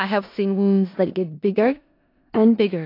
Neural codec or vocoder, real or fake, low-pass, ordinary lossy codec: codec, 16 kHz in and 24 kHz out, 0.4 kbps, LongCat-Audio-Codec, four codebook decoder; fake; 5.4 kHz; AAC, 32 kbps